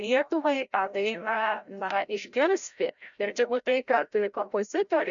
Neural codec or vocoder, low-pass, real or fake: codec, 16 kHz, 0.5 kbps, FreqCodec, larger model; 7.2 kHz; fake